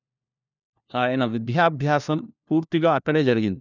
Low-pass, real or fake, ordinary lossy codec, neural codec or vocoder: 7.2 kHz; fake; none; codec, 16 kHz, 1 kbps, FunCodec, trained on LibriTTS, 50 frames a second